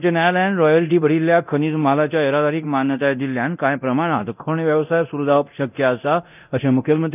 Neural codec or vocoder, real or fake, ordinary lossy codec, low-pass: codec, 24 kHz, 0.9 kbps, DualCodec; fake; none; 3.6 kHz